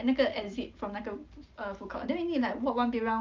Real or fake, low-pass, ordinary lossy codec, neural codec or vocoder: real; 7.2 kHz; Opus, 32 kbps; none